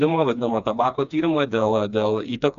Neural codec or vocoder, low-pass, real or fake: codec, 16 kHz, 2 kbps, FreqCodec, smaller model; 7.2 kHz; fake